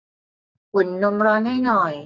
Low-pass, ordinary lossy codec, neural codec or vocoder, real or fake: 7.2 kHz; none; codec, 44.1 kHz, 2.6 kbps, SNAC; fake